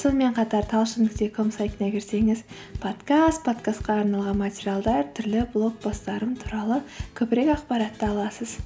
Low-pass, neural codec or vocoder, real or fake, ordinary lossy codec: none; none; real; none